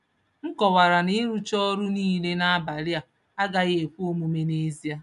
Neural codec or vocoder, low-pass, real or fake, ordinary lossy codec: none; 10.8 kHz; real; none